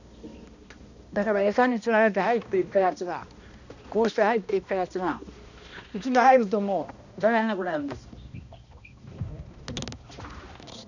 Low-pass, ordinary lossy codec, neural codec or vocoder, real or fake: 7.2 kHz; none; codec, 16 kHz, 1 kbps, X-Codec, HuBERT features, trained on balanced general audio; fake